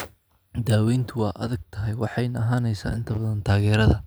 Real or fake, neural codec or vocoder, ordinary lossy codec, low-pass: real; none; none; none